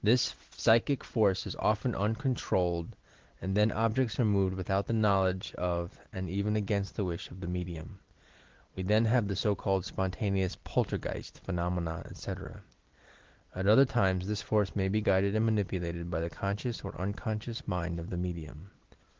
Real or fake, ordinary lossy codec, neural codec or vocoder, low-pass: real; Opus, 16 kbps; none; 7.2 kHz